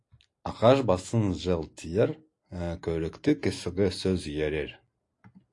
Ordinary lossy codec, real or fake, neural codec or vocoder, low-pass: AAC, 48 kbps; real; none; 10.8 kHz